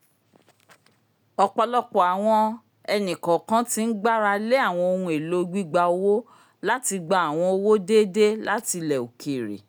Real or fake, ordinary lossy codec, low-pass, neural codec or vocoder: real; none; none; none